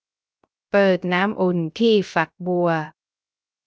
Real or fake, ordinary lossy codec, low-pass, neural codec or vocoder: fake; none; none; codec, 16 kHz, 0.3 kbps, FocalCodec